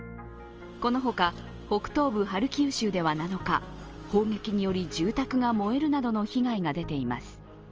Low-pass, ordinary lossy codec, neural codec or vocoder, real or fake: 7.2 kHz; Opus, 24 kbps; none; real